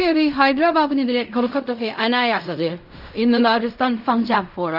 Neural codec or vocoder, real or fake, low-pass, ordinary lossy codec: codec, 16 kHz in and 24 kHz out, 0.4 kbps, LongCat-Audio-Codec, fine tuned four codebook decoder; fake; 5.4 kHz; none